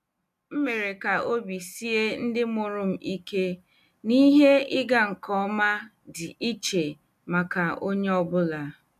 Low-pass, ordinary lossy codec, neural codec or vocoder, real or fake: 14.4 kHz; none; none; real